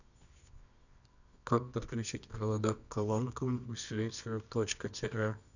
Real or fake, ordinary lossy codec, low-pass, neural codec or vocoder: fake; none; 7.2 kHz; codec, 24 kHz, 0.9 kbps, WavTokenizer, medium music audio release